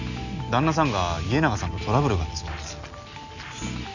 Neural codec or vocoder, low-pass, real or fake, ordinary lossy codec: none; 7.2 kHz; real; none